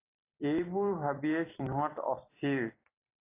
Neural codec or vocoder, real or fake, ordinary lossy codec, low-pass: vocoder, 44.1 kHz, 128 mel bands every 256 samples, BigVGAN v2; fake; AAC, 24 kbps; 3.6 kHz